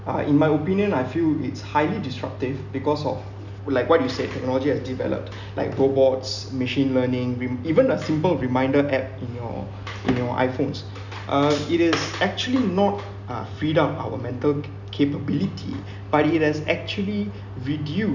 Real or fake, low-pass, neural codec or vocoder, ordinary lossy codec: real; 7.2 kHz; none; none